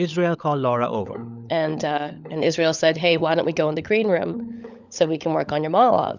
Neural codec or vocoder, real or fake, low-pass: codec, 16 kHz, 16 kbps, FunCodec, trained on LibriTTS, 50 frames a second; fake; 7.2 kHz